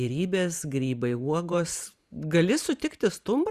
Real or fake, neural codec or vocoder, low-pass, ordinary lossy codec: fake; vocoder, 44.1 kHz, 128 mel bands every 512 samples, BigVGAN v2; 14.4 kHz; Opus, 64 kbps